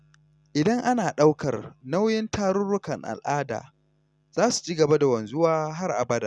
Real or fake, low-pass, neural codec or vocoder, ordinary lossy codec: real; none; none; none